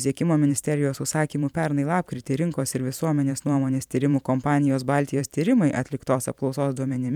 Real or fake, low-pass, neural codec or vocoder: fake; 19.8 kHz; vocoder, 44.1 kHz, 128 mel bands every 512 samples, BigVGAN v2